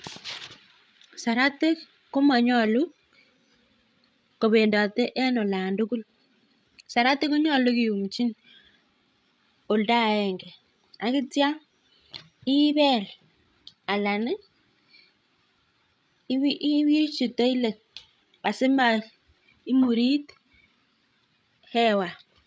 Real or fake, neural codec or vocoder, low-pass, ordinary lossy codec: fake; codec, 16 kHz, 16 kbps, FreqCodec, larger model; none; none